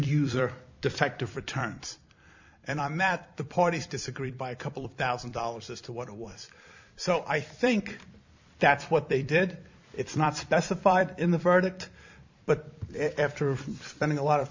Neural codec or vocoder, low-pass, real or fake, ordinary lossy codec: vocoder, 44.1 kHz, 128 mel bands every 256 samples, BigVGAN v2; 7.2 kHz; fake; MP3, 64 kbps